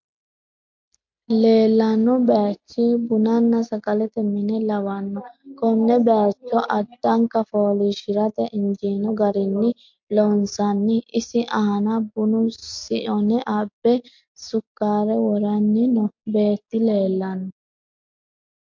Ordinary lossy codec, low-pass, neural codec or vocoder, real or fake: MP3, 48 kbps; 7.2 kHz; none; real